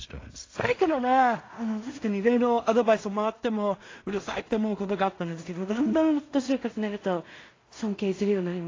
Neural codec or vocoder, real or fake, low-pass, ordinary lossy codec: codec, 16 kHz in and 24 kHz out, 0.4 kbps, LongCat-Audio-Codec, two codebook decoder; fake; 7.2 kHz; AAC, 32 kbps